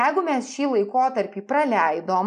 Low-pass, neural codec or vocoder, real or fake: 9.9 kHz; none; real